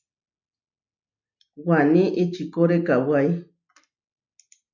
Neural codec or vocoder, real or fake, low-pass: none; real; 7.2 kHz